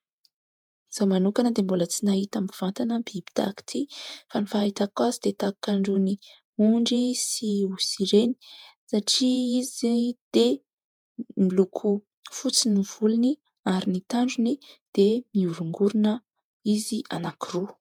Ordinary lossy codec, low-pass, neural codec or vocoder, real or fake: MP3, 96 kbps; 19.8 kHz; vocoder, 48 kHz, 128 mel bands, Vocos; fake